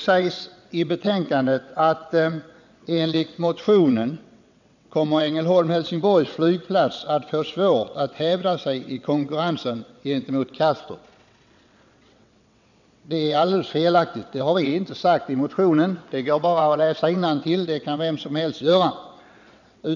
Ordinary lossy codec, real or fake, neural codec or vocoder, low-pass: none; fake; vocoder, 22.05 kHz, 80 mel bands, WaveNeXt; 7.2 kHz